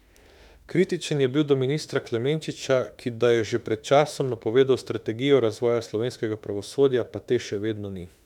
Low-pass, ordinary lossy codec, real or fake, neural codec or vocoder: 19.8 kHz; none; fake; autoencoder, 48 kHz, 32 numbers a frame, DAC-VAE, trained on Japanese speech